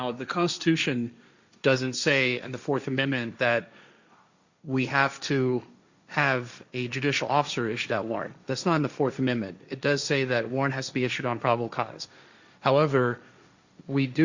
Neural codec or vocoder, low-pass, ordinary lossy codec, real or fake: codec, 16 kHz, 1.1 kbps, Voila-Tokenizer; 7.2 kHz; Opus, 64 kbps; fake